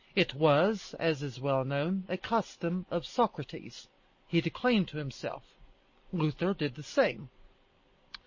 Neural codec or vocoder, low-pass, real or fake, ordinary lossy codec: none; 7.2 kHz; real; MP3, 32 kbps